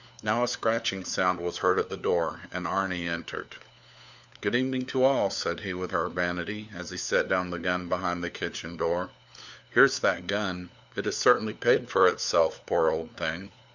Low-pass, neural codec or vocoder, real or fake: 7.2 kHz; codec, 16 kHz, 4 kbps, FunCodec, trained on LibriTTS, 50 frames a second; fake